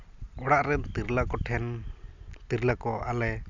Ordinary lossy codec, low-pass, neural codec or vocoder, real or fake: none; 7.2 kHz; none; real